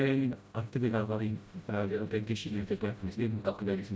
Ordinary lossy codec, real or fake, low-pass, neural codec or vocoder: none; fake; none; codec, 16 kHz, 0.5 kbps, FreqCodec, smaller model